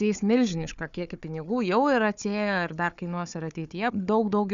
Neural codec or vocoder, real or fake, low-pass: codec, 16 kHz, 4 kbps, FunCodec, trained on Chinese and English, 50 frames a second; fake; 7.2 kHz